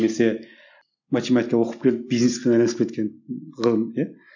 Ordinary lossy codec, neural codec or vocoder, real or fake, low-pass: none; none; real; 7.2 kHz